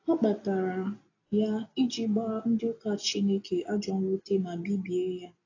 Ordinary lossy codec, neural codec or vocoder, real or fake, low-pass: AAC, 32 kbps; none; real; 7.2 kHz